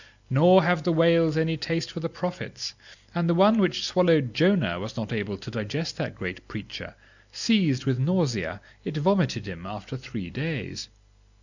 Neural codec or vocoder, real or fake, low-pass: none; real; 7.2 kHz